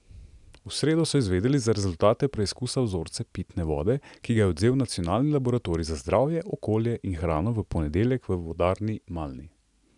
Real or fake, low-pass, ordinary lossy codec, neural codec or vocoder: real; 10.8 kHz; none; none